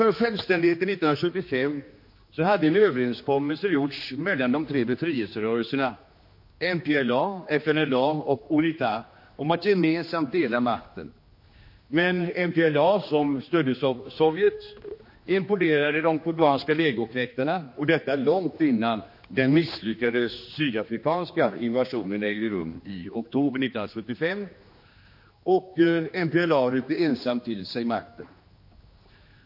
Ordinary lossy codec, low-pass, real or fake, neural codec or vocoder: MP3, 32 kbps; 5.4 kHz; fake; codec, 16 kHz, 2 kbps, X-Codec, HuBERT features, trained on general audio